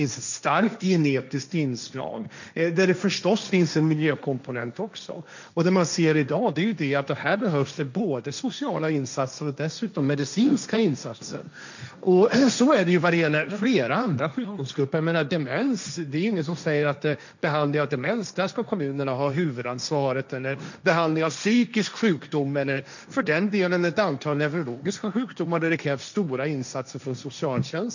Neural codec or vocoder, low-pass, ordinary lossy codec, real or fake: codec, 16 kHz, 1.1 kbps, Voila-Tokenizer; 7.2 kHz; none; fake